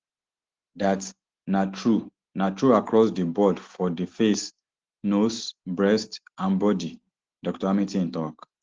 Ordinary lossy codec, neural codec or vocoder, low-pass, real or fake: Opus, 16 kbps; none; 7.2 kHz; real